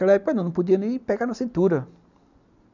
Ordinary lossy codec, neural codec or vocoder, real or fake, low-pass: none; none; real; 7.2 kHz